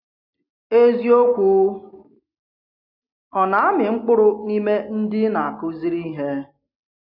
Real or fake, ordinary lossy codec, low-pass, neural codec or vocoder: real; AAC, 48 kbps; 5.4 kHz; none